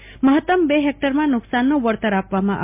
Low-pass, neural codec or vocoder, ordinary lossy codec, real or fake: 3.6 kHz; none; MP3, 32 kbps; real